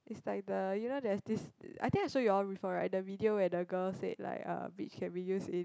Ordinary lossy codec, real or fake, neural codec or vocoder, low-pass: none; real; none; none